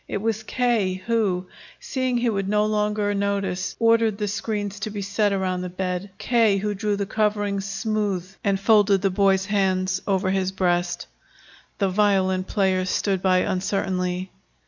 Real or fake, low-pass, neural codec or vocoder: real; 7.2 kHz; none